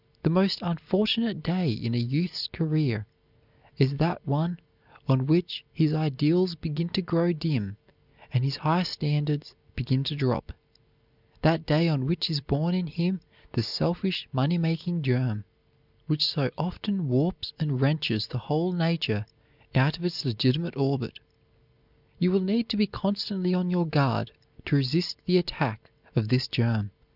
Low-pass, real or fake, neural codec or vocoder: 5.4 kHz; real; none